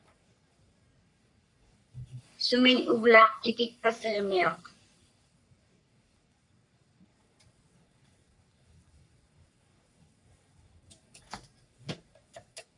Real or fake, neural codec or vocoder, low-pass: fake; codec, 44.1 kHz, 3.4 kbps, Pupu-Codec; 10.8 kHz